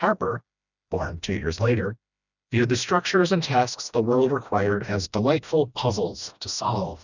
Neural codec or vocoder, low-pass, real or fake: codec, 16 kHz, 1 kbps, FreqCodec, smaller model; 7.2 kHz; fake